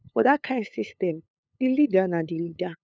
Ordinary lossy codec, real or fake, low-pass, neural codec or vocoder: none; fake; none; codec, 16 kHz, 8 kbps, FunCodec, trained on LibriTTS, 25 frames a second